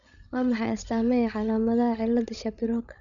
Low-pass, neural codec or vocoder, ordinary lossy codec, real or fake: 7.2 kHz; codec, 16 kHz, 16 kbps, FreqCodec, larger model; none; fake